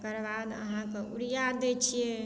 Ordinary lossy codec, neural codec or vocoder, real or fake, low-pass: none; none; real; none